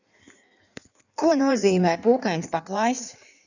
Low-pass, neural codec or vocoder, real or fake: 7.2 kHz; codec, 16 kHz in and 24 kHz out, 1.1 kbps, FireRedTTS-2 codec; fake